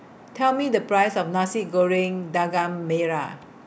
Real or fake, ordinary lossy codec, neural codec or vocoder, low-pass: real; none; none; none